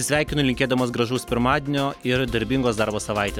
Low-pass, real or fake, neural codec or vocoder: 19.8 kHz; real; none